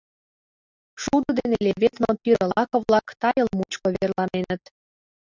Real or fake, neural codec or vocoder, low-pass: real; none; 7.2 kHz